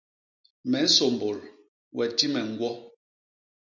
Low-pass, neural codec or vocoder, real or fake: 7.2 kHz; none; real